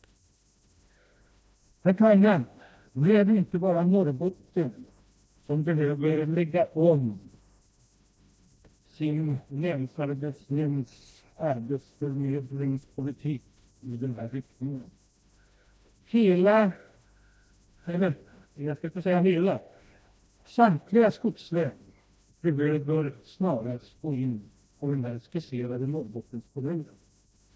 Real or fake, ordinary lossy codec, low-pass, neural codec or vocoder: fake; none; none; codec, 16 kHz, 1 kbps, FreqCodec, smaller model